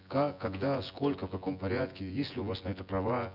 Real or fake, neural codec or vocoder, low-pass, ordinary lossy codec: fake; vocoder, 24 kHz, 100 mel bands, Vocos; 5.4 kHz; none